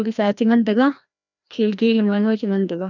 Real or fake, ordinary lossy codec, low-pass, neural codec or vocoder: fake; none; 7.2 kHz; codec, 16 kHz, 1 kbps, FreqCodec, larger model